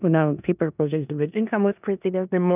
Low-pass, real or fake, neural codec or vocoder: 3.6 kHz; fake; codec, 16 kHz in and 24 kHz out, 0.4 kbps, LongCat-Audio-Codec, four codebook decoder